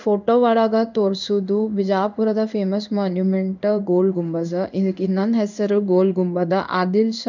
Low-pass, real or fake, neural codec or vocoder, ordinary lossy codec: 7.2 kHz; fake; codec, 16 kHz in and 24 kHz out, 1 kbps, XY-Tokenizer; none